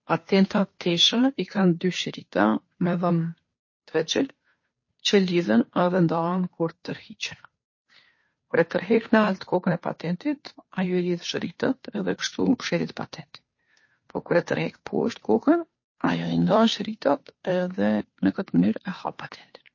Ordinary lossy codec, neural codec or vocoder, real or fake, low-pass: MP3, 32 kbps; codec, 16 kHz, 2 kbps, FunCodec, trained on Chinese and English, 25 frames a second; fake; 7.2 kHz